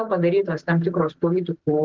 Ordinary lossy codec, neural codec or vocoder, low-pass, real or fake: Opus, 16 kbps; none; 7.2 kHz; real